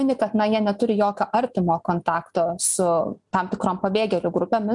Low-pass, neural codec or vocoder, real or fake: 10.8 kHz; none; real